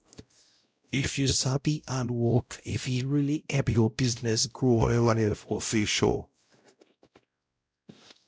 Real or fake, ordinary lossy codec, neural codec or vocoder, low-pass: fake; none; codec, 16 kHz, 0.5 kbps, X-Codec, WavLM features, trained on Multilingual LibriSpeech; none